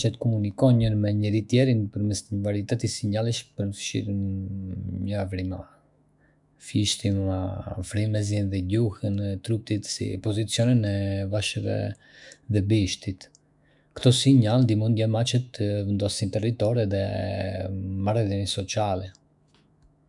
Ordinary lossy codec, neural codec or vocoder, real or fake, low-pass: none; autoencoder, 48 kHz, 128 numbers a frame, DAC-VAE, trained on Japanese speech; fake; 10.8 kHz